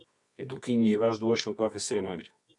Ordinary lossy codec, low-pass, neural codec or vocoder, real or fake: MP3, 96 kbps; 10.8 kHz; codec, 24 kHz, 0.9 kbps, WavTokenizer, medium music audio release; fake